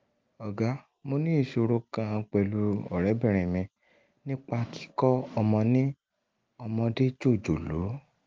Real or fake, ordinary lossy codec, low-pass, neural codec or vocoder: real; Opus, 24 kbps; 7.2 kHz; none